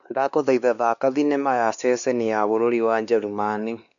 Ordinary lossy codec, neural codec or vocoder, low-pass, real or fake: none; codec, 16 kHz, 2 kbps, X-Codec, WavLM features, trained on Multilingual LibriSpeech; 7.2 kHz; fake